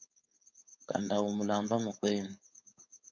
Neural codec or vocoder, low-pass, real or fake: codec, 16 kHz, 4.8 kbps, FACodec; 7.2 kHz; fake